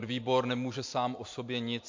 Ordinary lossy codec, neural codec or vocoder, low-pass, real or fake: MP3, 48 kbps; none; 7.2 kHz; real